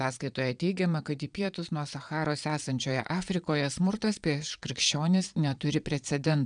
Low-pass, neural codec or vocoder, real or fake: 9.9 kHz; vocoder, 22.05 kHz, 80 mel bands, Vocos; fake